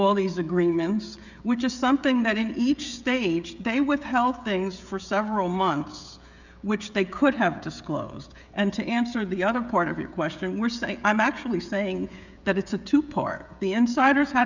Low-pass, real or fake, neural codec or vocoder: 7.2 kHz; fake; codec, 16 kHz, 16 kbps, FreqCodec, smaller model